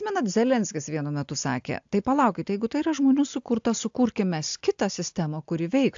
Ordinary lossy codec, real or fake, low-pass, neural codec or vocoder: AAC, 64 kbps; real; 7.2 kHz; none